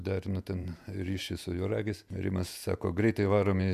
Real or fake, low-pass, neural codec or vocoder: fake; 14.4 kHz; vocoder, 48 kHz, 128 mel bands, Vocos